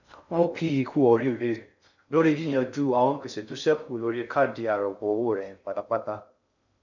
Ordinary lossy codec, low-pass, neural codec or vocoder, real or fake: none; 7.2 kHz; codec, 16 kHz in and 24 kHz out, 0.6 kbps, FocalCodec, streaming, 2048 codes; fake